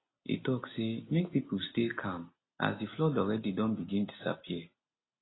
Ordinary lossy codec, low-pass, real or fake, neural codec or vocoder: AAC, 16 kbps; 7.2 kHz; real; none